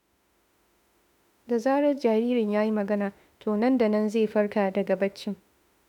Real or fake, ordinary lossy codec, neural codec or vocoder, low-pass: fake; none; autoencoder, 48 kHz, 32 numbers a frame, DAC-VAE, trained on Japanese speech; 19.8 kHz